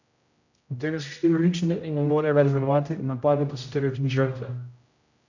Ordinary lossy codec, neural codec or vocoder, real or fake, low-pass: none; codec, 16 kHz, 0.5 kbps, X-Codec, HuBERT features, trained on general audio; fake; 7.2 kHz